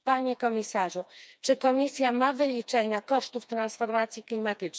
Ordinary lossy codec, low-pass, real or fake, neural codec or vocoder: none; none; fake; codec, 16 kHz, 2 kbps, FreqCodec, smaller model